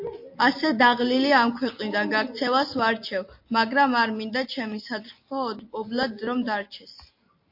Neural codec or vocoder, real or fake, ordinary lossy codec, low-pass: none; real; MP3, 32 kbps; 5.4 kHz